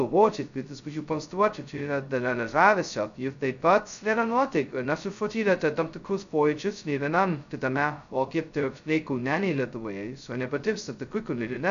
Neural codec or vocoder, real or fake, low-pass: codec, 16 kHz, 0.2 kbps, FocalCodec; fake; 7.2 kHz